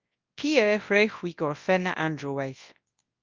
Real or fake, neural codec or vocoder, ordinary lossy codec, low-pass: fake; codec, 24 kHz, 0.9 kbps, WavTokenizer, large speech release; Opus, 24 kbps; 7.2 kHz